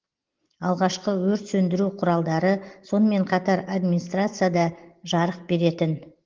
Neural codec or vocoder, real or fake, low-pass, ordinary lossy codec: none; real; 7.2 kHz; Opus, 16 kbps